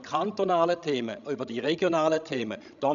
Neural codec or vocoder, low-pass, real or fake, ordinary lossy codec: codec, 16 kHz, 16 kbps, FreqCodec, larger model; 7.2 kHz; fake; none